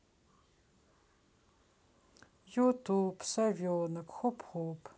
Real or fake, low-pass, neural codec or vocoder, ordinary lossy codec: real; none; none; none